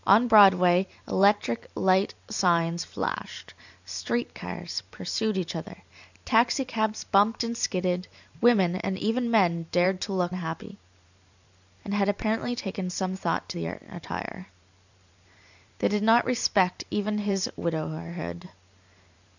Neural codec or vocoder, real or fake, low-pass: vocoder, 44.1 kHz, 128 mel bands every 512 samples, BigVGAN v2; fake; 7.2 kHz